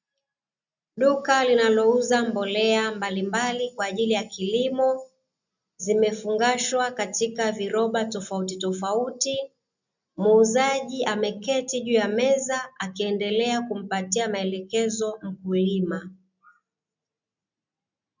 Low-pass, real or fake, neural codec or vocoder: 7.2 kHz; real; none